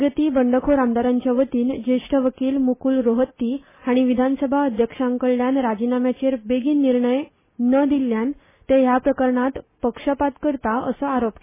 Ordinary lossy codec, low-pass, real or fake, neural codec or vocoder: MP3, 16 kbps; 3.6 kHz; real; none